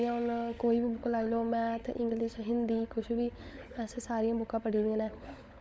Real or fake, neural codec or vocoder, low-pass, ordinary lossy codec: fake; codec, 16 kHz, 16 kbps, FunCodec, trained on LibriTTS, 50 frames a second; none; none